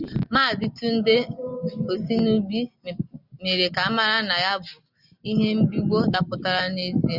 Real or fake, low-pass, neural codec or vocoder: real; 5.4 kHz; none